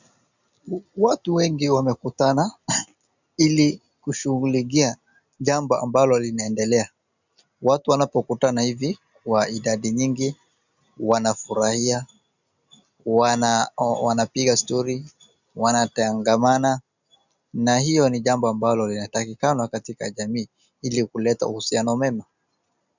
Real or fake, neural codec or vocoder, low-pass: real; none; 7.2 kHz